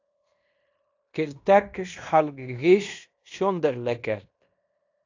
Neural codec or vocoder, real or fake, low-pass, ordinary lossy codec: codec, 16 kHz in and 24 kHz out, 0.9 kbps, LongCat-Audio-Codec, fine tuned four codebook decoder; fake; 7.2 kHz; AAC, 48 kbps